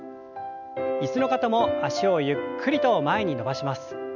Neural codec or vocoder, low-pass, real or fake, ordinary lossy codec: none; 7.2 kHz; real; none